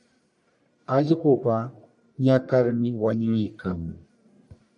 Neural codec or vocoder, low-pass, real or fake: codec, 44.1 kHz, 1.7 kbps, Pupu-Codec; 10.8 kHz; fake